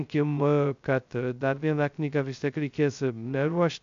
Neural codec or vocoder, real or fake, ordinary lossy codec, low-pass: codec, 16 kHz, 0.2 kbps, FocalCodec; fake; AAC, 64 kbps; 7.2 kHz